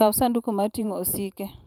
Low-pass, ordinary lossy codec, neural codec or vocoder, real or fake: none; none; vocoder, 44.1 kHz, 128 mel bands every 512 samples, BigVGAN v2; fake